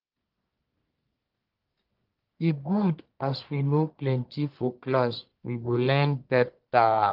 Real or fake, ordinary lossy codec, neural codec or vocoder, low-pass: fake; Opus, 24 kbps; codec, 44.1 kHz, 1.7 kbps, Pupu-Codec; 5.4 kHz